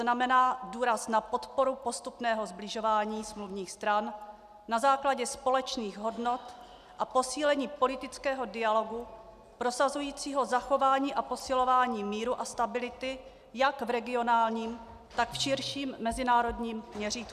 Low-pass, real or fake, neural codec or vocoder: 14.4 kHz; real; none